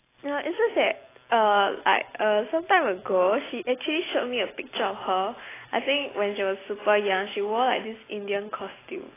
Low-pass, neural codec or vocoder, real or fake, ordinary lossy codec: 3.6 kHz; none; real; AAC, 16 kbps